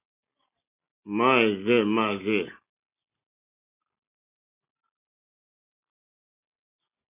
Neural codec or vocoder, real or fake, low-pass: none; real; 3.6 kHz